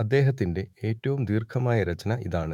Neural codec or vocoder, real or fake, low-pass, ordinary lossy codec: autoencoder, 48 kHz, 128 numbers a frame, DAC-VAE, trained on Japanese speech; fake; 19.8 kHz; none